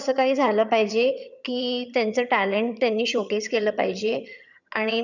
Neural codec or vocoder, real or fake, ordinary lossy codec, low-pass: vocoder, 22.05 kHz, 80 mel bands, HiFi-GAN; fake; none; 7.2 kHz